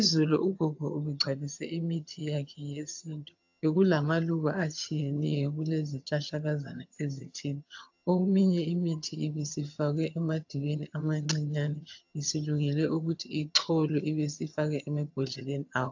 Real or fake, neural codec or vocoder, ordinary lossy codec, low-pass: fake; vocoder, 22.05 kHz, 80 mel bands, HiFi-GAN; AAC, 48 kbps; 7.2 kHz